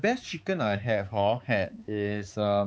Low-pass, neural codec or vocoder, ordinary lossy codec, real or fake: none; codec, 16 kHz, 4 kbps, X-Codec, HuBERT features, trained on balanced general audio; none; fake